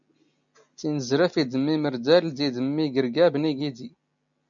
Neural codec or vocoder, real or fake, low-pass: none; real; 7.2 kHz